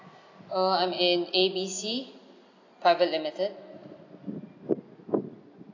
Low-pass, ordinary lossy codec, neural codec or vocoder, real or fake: 7.2 kHz; AAC, 32 kbps; none; real